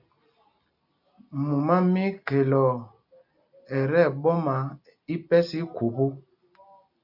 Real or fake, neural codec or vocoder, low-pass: real; none; 5.4 kHz